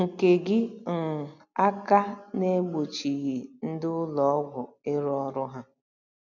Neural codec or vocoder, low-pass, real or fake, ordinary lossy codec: none; 7.2 kHz; real; none